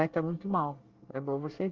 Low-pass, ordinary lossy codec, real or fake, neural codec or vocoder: 7.2 kHz; Opus, 32 kbps; fake; codec, 24 kHz, 1 kbps, SNAC